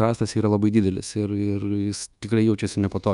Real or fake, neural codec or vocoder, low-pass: fake; codec, 24 kHz, 1.2 kbps, DualCodec; 10.8 kHz